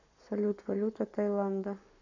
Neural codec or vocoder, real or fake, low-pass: codec, 44.1 kHz, 7.8 kbps, Pupu-Codec; fake; 7.2 kHz